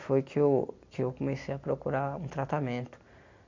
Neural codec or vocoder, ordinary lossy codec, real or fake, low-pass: none; MP3, 48 kbps; real; 7.2 kHz